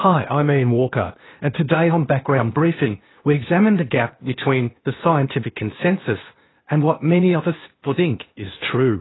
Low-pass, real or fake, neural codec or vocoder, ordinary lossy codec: 7.2 kHz; fake; codec, 16 kHz, 0.8 kbps, ZipCodec; AAC, 16 kbps